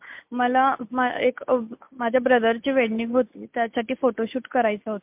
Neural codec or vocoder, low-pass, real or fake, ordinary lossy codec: none; 3.6 kHz; real; MP3, 32 kbps